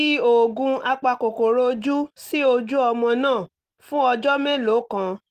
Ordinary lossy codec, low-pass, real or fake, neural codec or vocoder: Opus, 24 kbps; 14.4 kHz; real; none